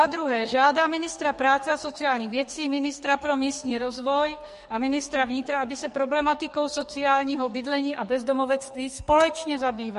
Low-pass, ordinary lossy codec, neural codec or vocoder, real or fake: 14.4 kHz; MP3, 48 kbps; codec, 44.1 kHz, 2.6 kbps, SNAC; fake